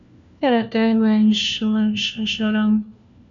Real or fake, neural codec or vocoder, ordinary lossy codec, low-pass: fake; codec, 16 kHz, 1 kbps, FunCodec, trained on LibriTTS, 50 frames a second; MP3, 64 kbps; 7.2 kHz